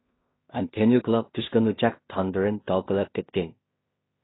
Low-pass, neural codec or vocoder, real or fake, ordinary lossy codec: 7.2 kHz; codec, 16 kHz in and 24 kHz out, 0.4 kbps, LongCat-Audio-Codec, two codebook decoder; fake; AAC, 16 kbps